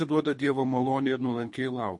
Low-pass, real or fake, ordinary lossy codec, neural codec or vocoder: 10.8 kHz; fake; MP3, 48 kbps; codec, 24 kHz, 3 kbps, HILCodec